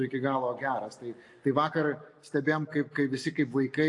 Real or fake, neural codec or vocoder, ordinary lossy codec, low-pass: real; none; AAC, 48 kbps; 10.8 kHz